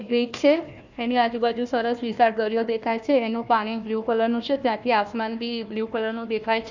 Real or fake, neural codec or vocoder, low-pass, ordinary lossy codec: fake; codec, 16 kHz, 1 kbps, FunCodec, trained on Chinese and English, 50 frames a second; 7.2 kHz; none